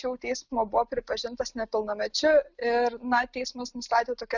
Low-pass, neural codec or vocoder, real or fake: 7.2 kHz; none; real